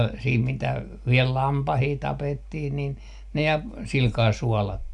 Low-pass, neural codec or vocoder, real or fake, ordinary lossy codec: 10.8 kHz; none; real; none